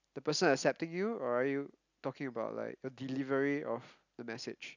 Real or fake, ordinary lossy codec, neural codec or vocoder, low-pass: real; none; none; 7.2 kHz